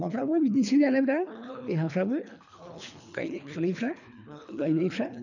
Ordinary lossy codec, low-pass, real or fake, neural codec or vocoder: none; 7.2 kHz; fake; codec, 24 kHz, 3 kbps, HILCodec